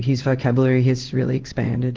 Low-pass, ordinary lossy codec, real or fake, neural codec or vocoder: 7.2 kHz; Opus, 16 kbps; fake; codec, 16 kHz in and 24 kHz out, 1 kbps, XY-Tokenizer